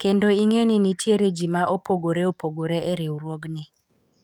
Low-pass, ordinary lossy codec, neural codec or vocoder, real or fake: 19.8 kHz; none; codec, 44.1 kHz, 7.8 kbps, DAC; fake